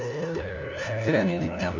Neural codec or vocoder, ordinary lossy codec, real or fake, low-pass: codec, 16 kHz, 1 kbps, FunCodec, trained on LibriTTS, 50 frames a second; none; fake; 7.2 kHz